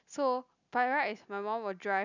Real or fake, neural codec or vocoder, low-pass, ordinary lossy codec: real; none; 7.2 kHz; none